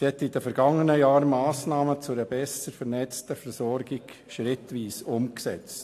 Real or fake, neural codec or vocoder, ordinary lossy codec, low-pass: real; none; AAC, 64 kbps; 14.4 kHz